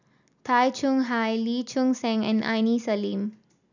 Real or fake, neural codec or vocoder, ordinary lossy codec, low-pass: real; none; none; 7.2 kHz